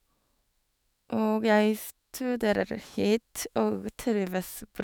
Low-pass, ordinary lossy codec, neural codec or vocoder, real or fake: none; none; autoencoder, 48 kHz, 128 numbers a frame, DAC-VAE, trained on Japanese speech; fake